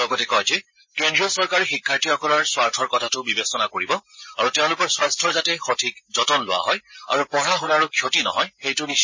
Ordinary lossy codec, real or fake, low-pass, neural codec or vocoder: MP3, 32 kbps; real; 7.2 kHz; none